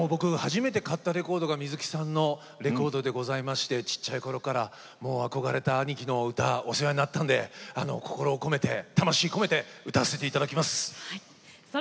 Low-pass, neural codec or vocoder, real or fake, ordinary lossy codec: none; none; real; none